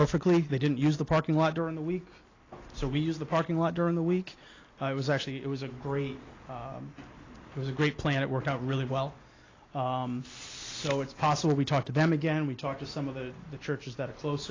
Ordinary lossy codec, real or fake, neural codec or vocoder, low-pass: AAC, 32 kbps; real; none; 7.2 kHz